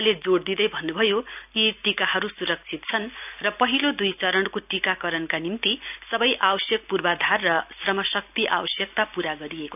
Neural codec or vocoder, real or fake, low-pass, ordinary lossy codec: none; real; 3.6 kHz; none